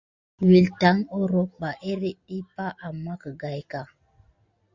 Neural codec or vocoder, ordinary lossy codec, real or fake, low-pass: none; Opus, 64 kbps; real; 7.2 kHz